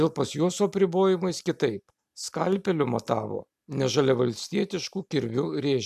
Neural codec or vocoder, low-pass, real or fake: none; 14.4 kHz; real